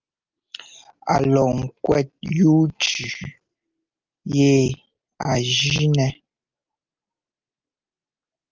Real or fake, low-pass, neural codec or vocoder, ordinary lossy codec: real; 7.2 kHz; none; Opus, 24 kbps